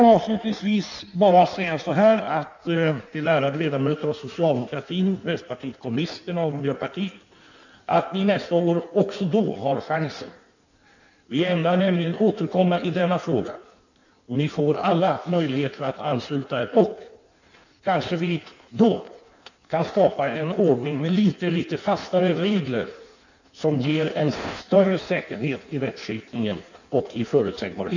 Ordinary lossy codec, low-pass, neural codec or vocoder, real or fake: none; 7.2 kHz; codec, 16 kHz in and 24 kHz out, 1.1 kbps, FireRedTTS-2 codec; fake